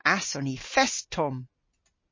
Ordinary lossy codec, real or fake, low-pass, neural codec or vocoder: MP3, 32 kbps; real; 7.2 kHz; none